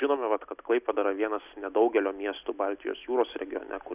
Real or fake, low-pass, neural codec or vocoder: real; 3.6 kHz; none